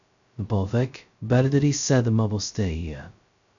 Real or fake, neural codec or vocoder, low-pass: fake; codec, 16 kHz, 0.2 kbps, FocalCodec; 7.2 kHz